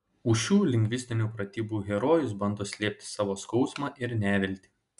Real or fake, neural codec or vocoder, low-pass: real; none; 10.8 kHz